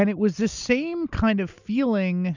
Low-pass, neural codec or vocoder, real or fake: 7.2 kHz; none; real